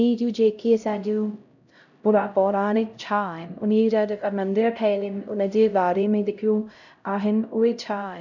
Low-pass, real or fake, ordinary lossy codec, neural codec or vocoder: 7.2 kHz; fake; none; codec, 16 kHz, 0.5 kbps, X-Codec, HuBERT features, trained on LibriSpeech